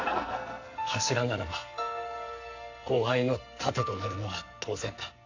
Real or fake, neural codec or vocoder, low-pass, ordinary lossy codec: fake; codec, 44.1 kHz, 2.6 kbps, SNAC; 7.2 kHz; AAC, 48 kbps